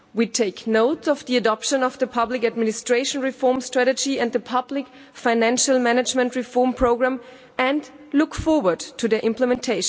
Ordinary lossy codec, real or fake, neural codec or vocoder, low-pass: none; real; none; none